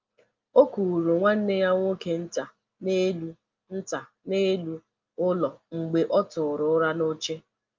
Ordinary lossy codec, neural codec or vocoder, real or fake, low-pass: Opus, 32 kbps; none; real; 7.2 kHz